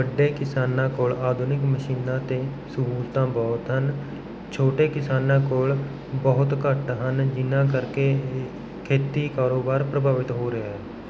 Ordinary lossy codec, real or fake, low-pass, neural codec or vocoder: none; real; none; none